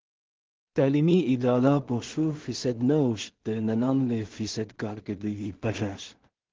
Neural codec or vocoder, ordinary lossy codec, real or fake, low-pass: codec, 16 kHz in and 24 kHz out, 0.4 kbps, LongCat-Audio-Codec, two codebook decoder; Opus, 16 kbps; fake; 7.2 kHz